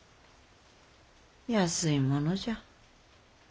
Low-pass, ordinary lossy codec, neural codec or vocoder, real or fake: none; none; none; real